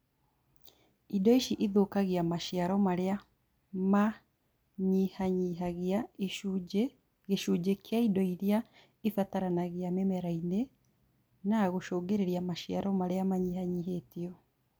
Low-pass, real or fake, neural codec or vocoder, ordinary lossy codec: none; real; none; none